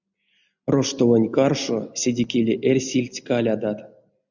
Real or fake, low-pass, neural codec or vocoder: real; 7.2 kHz; none